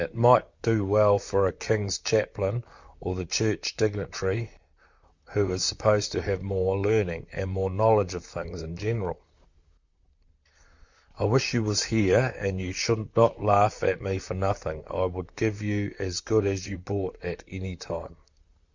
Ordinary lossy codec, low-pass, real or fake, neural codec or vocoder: Opus, 64 kbps; 7.2 kHz; fake; vocoder, 44.1 kHz, 128 mel bands, Pupu-Vocoder